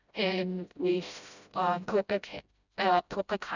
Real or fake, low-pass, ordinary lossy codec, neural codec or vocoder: fake; 7.2 kHz; none; codec, 16 kHz, 0.5 kbps, FreqCodec, smaller model